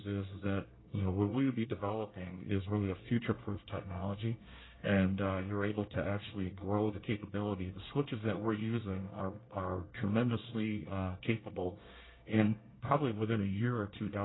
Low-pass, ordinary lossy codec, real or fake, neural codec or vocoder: 7.2 kHz; AAC, 16 kbps; fake; codec, 24 kHz, 1 kbps, SNAC